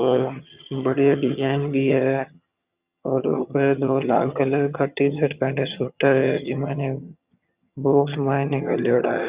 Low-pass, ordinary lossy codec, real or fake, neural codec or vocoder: 3.6 kHz; Opus, 64 kbps; fake; vocoder, 22.05 kHz, 80 mel bands, HiFi-GAN